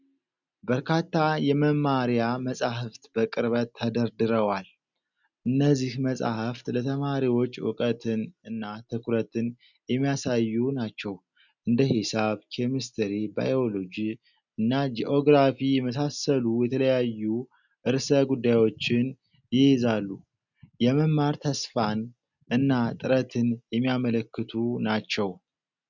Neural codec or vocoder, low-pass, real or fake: none; 7.2 kHz; real